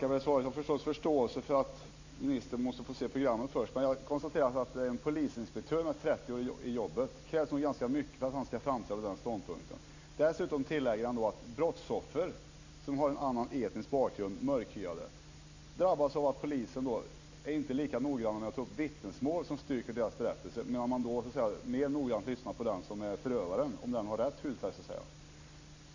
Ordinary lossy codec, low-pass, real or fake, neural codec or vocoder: Opus, 64 kbps; 7.2 kHz; real; none